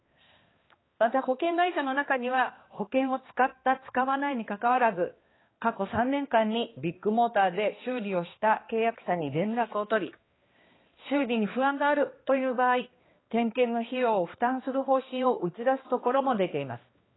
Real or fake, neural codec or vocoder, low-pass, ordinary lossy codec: fake; codec, 16 kHz, 2 kbps, X-Codec, HuBERT features, trained on balanced general audio; 7.2 kHz; AAC, 16 kbps